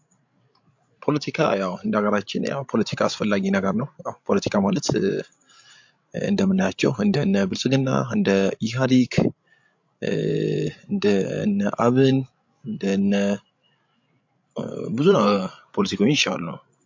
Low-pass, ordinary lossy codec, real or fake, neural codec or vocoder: 7.2 kHz; MP3, 48 kbps; fake; codec, 16 kHz, 16 kbps, FreqCodec, larger model